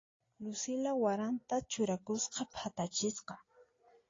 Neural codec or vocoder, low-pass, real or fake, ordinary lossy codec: none; 7.2 kHz; real; AAC, 32 kbps